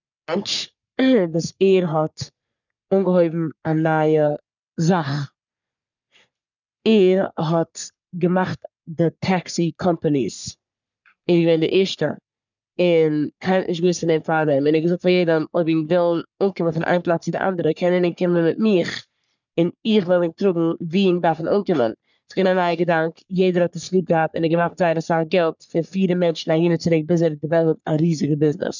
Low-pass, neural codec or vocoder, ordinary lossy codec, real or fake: 7.2 kHz; codec, 44.1 kHz, 3.4 kbps, Pupu-Codec; none; fake